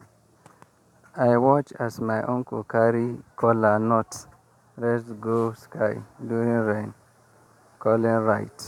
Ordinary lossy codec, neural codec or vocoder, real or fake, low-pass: none; vocoder, 44.1 kHz, 128 mel bands every 512 samples, BigVGAN v2; fake; 19.8 kHz